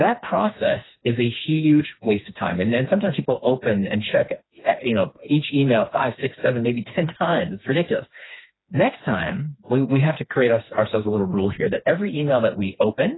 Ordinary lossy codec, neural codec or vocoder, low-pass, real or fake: AAC, 16 kbps; codec, 16 kHz, 2 kbps, FreqCodec, smaller model; 7.2 kHz; fake